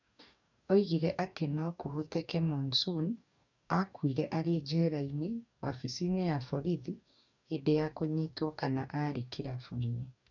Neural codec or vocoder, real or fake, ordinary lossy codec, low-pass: codec, 44.1 kHz, 2.6 kbps, DAC; fake; none; 7.2 kHz